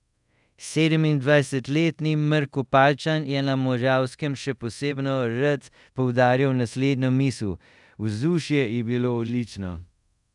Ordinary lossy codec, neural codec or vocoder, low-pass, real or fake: none; codec, 24 kHz, 0.5 kbps, DualCodec; 10.8 kHz; fake